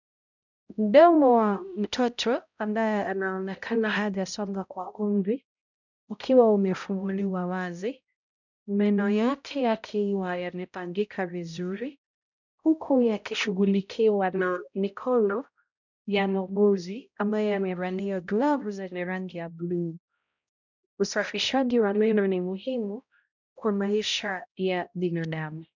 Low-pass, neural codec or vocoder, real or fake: 7.2 kHz; codec, 16 kHz, 0.5 kbps, X-Codec, HuBERT features, trained on balanced general audio; fake